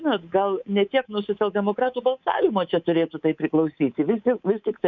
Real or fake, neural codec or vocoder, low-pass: fake; codec, 24 kHz, 3.1 kbps, DualCodec; 7.2 kHz